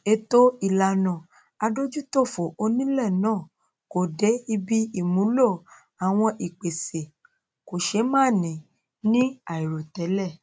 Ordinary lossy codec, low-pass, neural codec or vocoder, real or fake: none; none; none; real